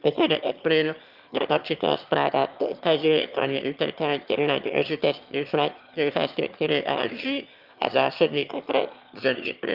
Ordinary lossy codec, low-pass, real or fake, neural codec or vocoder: Opus, 24 kbps; 5.4 kHz; fake; autoencoder, 22.05 kHz, a latent of 192 numbers a frame, VITS, trained on one speaker